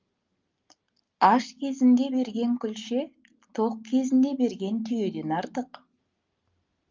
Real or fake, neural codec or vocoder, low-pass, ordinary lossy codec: real; none; 7.2 kHz; Opus, 24 kbps